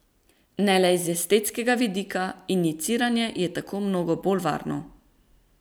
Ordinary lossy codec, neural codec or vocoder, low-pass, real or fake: none; vocoder, 44.1 kHz, 128 mel bands every 256 samples, BigVGAN v2; none; fake